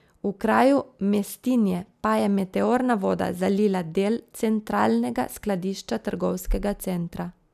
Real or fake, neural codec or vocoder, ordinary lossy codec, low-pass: real; none; none; 14.4 kHz